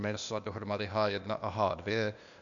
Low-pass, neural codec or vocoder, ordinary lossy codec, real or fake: 7.2 kHz; codec, 16 kHz, 0.8 kbps, ZipCodec; MP3, 96 kbps; fake